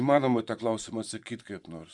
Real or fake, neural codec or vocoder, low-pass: fake; vocoder, 24 kHz, 100 mel bands, Vocos; 10.8 kHz